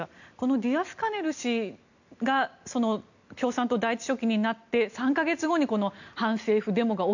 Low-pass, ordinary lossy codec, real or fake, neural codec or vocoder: 7.2 kHz; none; real; none